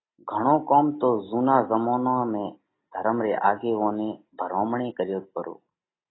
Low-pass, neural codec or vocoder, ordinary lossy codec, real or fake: 7.2 kHz; none; AAC, 16 kbps; real